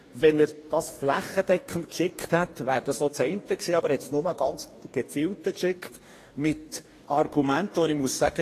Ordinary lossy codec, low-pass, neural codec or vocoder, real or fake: AAC, 48 kbps; 14.4 kHz; codec, 44.1 kHz, 2.6 kbps, DAC; fake